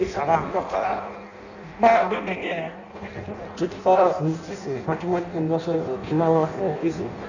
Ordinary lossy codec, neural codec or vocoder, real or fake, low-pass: none; codec, 16 kHz in and 24 kHz out, 0.6 kbps, FireRedTTS-2 codec; fake; 7.2 kHz